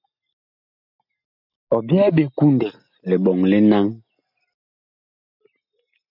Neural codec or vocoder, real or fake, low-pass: none; real; 5.4 kHz